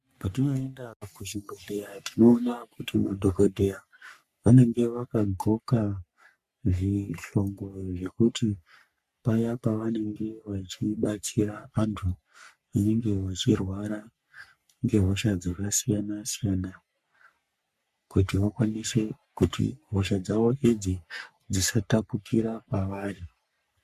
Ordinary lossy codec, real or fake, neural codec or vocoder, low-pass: MP3, 96 kbps; fake; codec, 44.1 kHz, 3.4 kbps, Pupu-Codec; 14.4 kHz